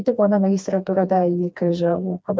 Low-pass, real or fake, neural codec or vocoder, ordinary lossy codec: none; fake; codec, 16 kHz, 2 kbps, FreqCodec, smaller model; none